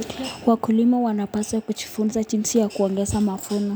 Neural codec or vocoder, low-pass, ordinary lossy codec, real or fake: none; none; none; real